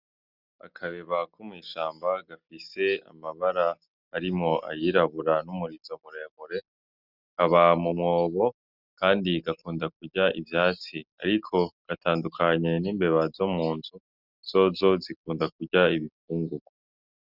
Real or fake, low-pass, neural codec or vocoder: real; 5.4 kHz; none